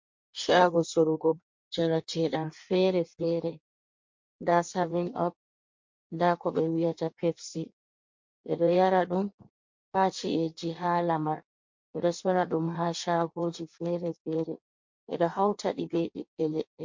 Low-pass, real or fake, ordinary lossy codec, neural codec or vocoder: 7.2 kHz; fake; MP3, 48 kbps; codec, 16 kHz in and 24 kHz out, 1.1 kbps, FireRedTTS-2 codec